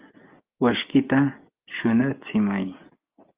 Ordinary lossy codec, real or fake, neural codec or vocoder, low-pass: Opus, 24 kbps; real; none; 3.6 kHz